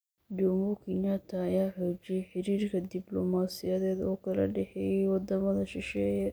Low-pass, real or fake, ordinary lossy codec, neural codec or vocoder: none; real; none; none